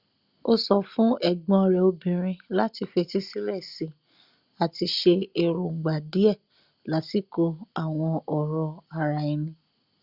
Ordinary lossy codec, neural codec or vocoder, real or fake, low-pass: Opus, 64 kbps; none; real; 5.4 kHz